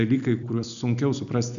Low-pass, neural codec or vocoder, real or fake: 7.2 kHz; none; real